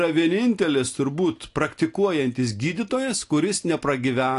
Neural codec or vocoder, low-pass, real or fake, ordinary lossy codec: none; 10.8 kHz; real; AAC, 48 kbps